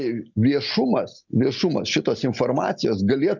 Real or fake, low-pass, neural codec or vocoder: real; 7.2 kHz; none